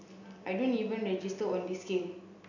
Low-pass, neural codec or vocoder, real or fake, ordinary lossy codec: 7.2 kHz; none; real; none